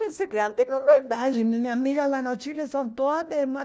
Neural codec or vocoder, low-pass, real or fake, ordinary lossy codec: codec, 16 kHz, 1 kbps, FunCodec, trained on LibriTTS, 50 frames a second; none; fake; none